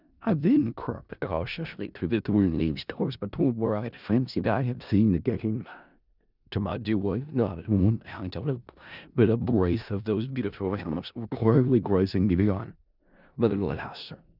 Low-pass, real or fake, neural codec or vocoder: 5.4 kHz; fake; codec, 16 kHz in and 24 kHz out, 0.4 kbps, LongCat-Audio-Codec, four codebook decoder